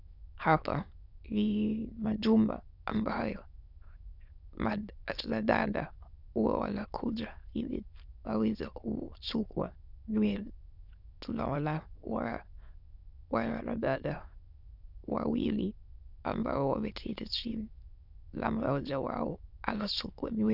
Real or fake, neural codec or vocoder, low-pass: fake; autoencoder, 22.05 kHz, a latent of 192 numbers a frame, VITS, trained on many speakers; 5.4 kHz